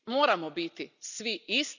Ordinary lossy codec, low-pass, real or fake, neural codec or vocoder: none; 7.2 kHz; real; none